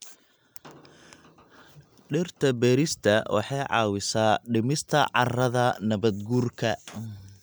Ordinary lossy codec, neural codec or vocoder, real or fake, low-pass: none; none; real; none